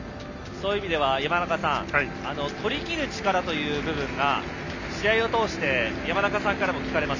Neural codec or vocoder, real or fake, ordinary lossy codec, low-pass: none; real; none; 7.2 kHz